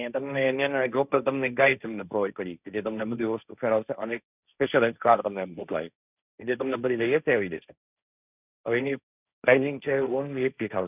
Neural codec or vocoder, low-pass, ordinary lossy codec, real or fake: codec, 16 kHz, 1.1 kbps, Voila-Tokenizer; 3.6 kHz; none; fake